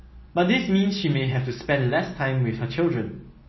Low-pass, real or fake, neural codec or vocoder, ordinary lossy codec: 7.2 kHz; real; none; MP3, 24 kbps